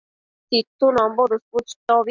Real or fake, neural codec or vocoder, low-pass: real; none; 7.2 kHz